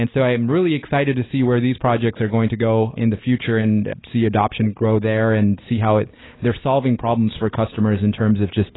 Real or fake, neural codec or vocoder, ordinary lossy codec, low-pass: real; none; AAC, 16 kbps; 7.2 kHz